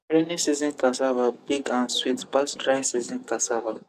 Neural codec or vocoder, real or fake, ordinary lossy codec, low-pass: codec, 44.1 kHz, 7.8 kbps, DAC; fake; none; 14.4 kHz